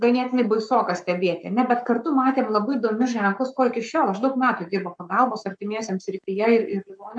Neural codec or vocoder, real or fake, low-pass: codec, 44.1 kHz, 7.8 kbps, Pupu-Codec; fake; 9.9 kHz